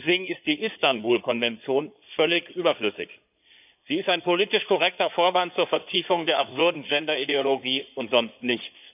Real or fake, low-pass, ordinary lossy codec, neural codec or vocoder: fake; 3.6 kHz; none; codec, 16 kHz in and 24 kHz out, 2.2 kbps, FireRedTTS-2 codec